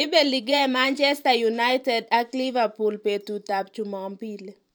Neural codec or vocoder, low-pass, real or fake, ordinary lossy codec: vocoder, 44.1 kHz, 128 mel bands every 512 samples, BigVGAN v2; none; fake; none